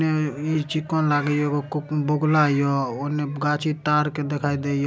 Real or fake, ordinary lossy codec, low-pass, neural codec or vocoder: real; none; none; none